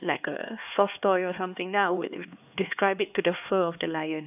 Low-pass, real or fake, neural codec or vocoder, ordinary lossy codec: 3.6 kHz; fake; codec, 16 kHz, 2 kbps, X-Codec, HuBERT features, trained on LibriSpeech; none